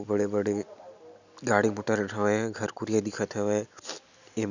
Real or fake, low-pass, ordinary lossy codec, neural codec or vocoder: real; 7.2 kHz; none; none